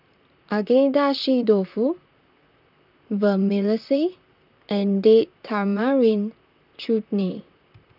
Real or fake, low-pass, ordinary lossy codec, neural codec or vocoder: fake; 5.4 kHz; none; vocoder, 44.1 kHz, 128 mel bands, Pupu-Vocoder